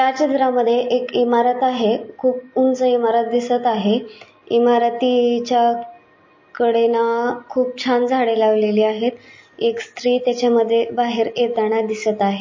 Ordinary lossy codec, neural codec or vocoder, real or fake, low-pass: MP3, 32 kbps; none; real; 7.2 kHz